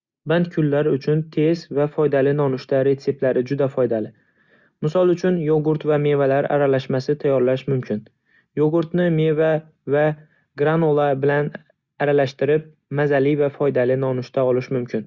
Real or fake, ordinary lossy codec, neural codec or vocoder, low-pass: real; Opus, 64 kbps; none; 7.2 kHz